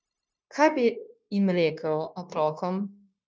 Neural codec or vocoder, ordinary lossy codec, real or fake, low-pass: codec, 16 kHz, 0.9 kbps, LongCat-Audio-Codec; none; fake; none